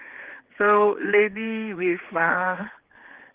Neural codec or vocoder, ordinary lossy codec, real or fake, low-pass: codec, 16 kHz, 4 kbps, X-Codec, HuBERT features, trained on general audio; Opus, 16 kbps; fake; 3.6 kHz